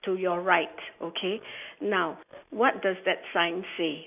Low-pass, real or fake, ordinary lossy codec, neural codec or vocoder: 3.6 kHz; real; none; none